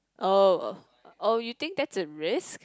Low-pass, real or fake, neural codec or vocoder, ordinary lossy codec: none; real; none; none